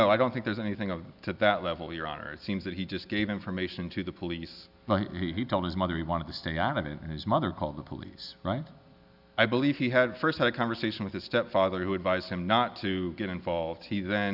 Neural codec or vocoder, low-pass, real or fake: none; 5.4 kHz; real